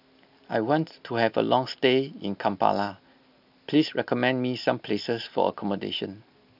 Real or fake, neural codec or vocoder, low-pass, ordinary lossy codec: real; none; 5.4 kHz; none